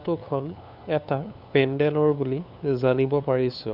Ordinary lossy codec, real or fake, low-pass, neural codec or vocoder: none; fake; 5.4 kHz; codec, 16 kHz, 2 kbps, FunCodec, trained on LibriTTS, 25 frames a second